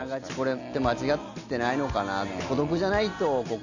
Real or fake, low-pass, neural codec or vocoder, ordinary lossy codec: real; 7.2 kHz; none; none